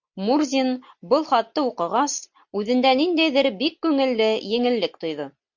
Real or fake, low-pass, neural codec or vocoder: real; 7.2 kHz; none